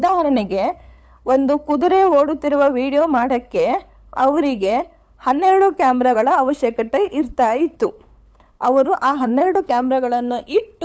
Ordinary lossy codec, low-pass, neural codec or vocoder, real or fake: none; none; codec, 16 kHz, 8 kbps, FunCodec, trained on LibriTTS, 25 frames a second; fake